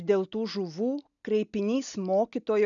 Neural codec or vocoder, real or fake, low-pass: none; real; 7.2 kHz